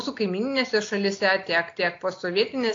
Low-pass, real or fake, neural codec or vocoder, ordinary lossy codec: 7.2 kHz; real; none; AAC, 48 kbps